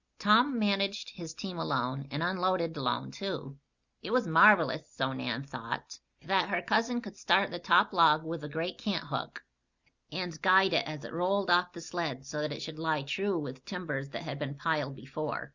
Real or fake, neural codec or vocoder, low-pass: real; none; 7.2 kHz